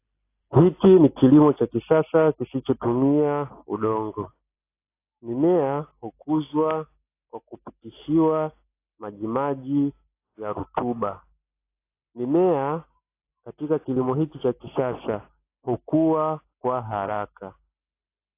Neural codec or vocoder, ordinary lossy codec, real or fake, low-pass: none; AAC, 24 kbps; real; 3.6 kHz